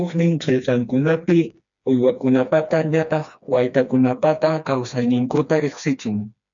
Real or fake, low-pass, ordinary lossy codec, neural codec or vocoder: fake; 7.2 kHz; MP3, 64 kbps; codec, 16 kHz, 2 kbps, FreqCodec, smaller model